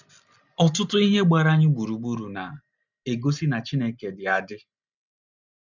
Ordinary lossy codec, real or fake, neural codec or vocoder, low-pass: none; real; none; 7.2 kHz